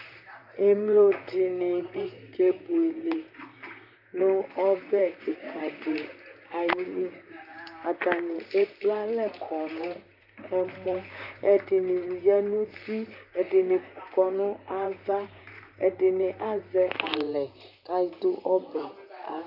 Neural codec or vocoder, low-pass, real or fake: vocoder, 44.1 kHz, 128 mel bands, Pupu-Vocoder; 5.4 kHz; fake